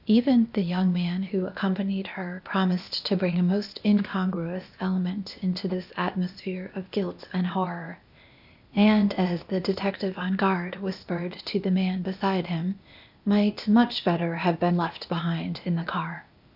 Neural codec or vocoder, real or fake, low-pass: codec, 16 kHz, 0.8 kbps, ZipCodec; fake; 5.4 kHz